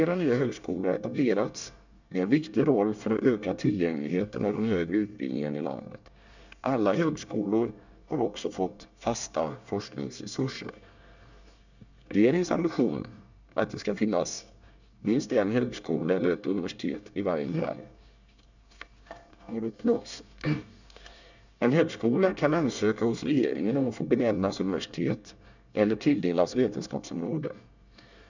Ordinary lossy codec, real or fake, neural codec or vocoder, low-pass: none; fake; codec, 24 kHz, 1 kbps, SNAC; 7.2 kHz